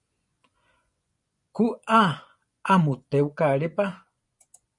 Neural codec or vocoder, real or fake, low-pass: vocoder, 24 kHz, 100 mel bands, Vocos; fake; 10.8 kHz